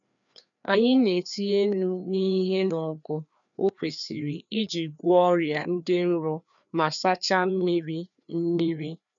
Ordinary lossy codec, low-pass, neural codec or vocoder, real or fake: none; 7.2 kHz; codec, 16 kHz, 2 kbps, FreqCodec, larger model; fake